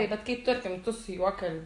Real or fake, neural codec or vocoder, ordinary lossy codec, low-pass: real; none; AAC, 48 kbps; 10.8 kHz